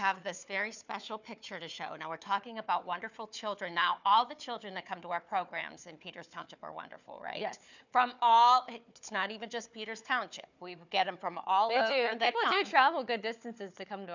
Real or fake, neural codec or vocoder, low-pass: fake; codec, 16 kHz, 4 kbps, FunCodec, trained on Chinese and English, 50 frames a second; 7.2 kHz